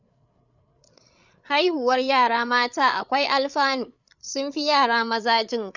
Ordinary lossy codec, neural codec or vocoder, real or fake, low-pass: none; codec, 16 kHz, 8 kbps, FreqCodec, larger model; fake; 7.2 kHz